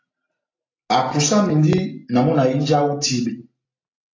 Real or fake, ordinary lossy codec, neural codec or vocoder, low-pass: real; AAC, 32 kbps; none; 7.2 kHz